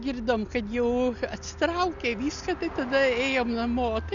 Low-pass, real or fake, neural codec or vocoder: 7.2 kHz; real; none